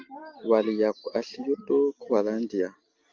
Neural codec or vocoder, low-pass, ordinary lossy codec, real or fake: none; 7.2 kHz; Opus, 32 kbps; real